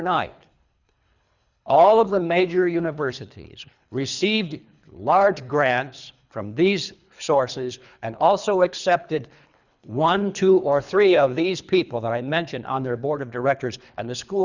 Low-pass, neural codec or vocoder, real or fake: 7.2 kHz; codec, 24 kHz, 3 kbps, HILCodec; fake